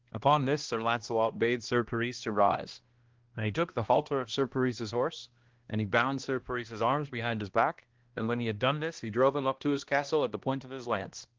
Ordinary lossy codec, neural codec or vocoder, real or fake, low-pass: Opus, 16 kbps; codec, 16 kHz, 1 kbps, X-Codec, HuBERT features, trained on balanced general audio; fake; 7.2 kHz